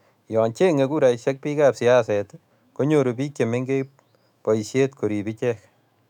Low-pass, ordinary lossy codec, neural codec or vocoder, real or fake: 19.8 kHz; none; autoencoder, 48 kHz, 128 numbers a frame, DAC-VAE, trained on Japanese speech; fake